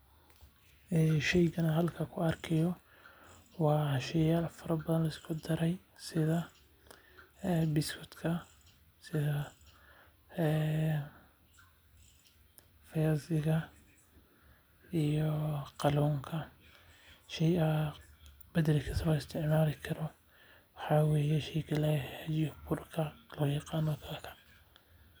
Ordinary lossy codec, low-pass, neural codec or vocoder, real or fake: none; none; none; real